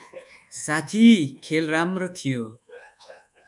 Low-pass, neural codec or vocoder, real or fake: 10.8 kHz; codec, 24 kHz, 1.2 kbps, DualCodec; fake